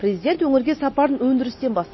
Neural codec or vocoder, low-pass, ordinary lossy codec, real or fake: none; 7.2 kHz; MP3, 24 kbps; real